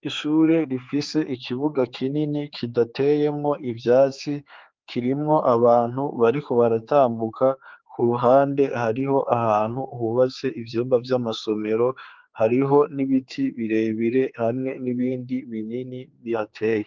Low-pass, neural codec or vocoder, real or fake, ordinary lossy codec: 7.2 kHz; codec, 16 kHz, 4 kbps, X-Codec, HuBERT features, trained on general audio; fake; Opus, 32 kbps